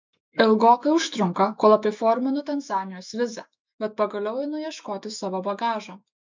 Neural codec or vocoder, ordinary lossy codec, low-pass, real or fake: autoencoder, 48 kHz, 128 numbers a frame, DAC-VAE, trained on Japanese speech; MP3, 64 kbps; 7.2 kHz; fake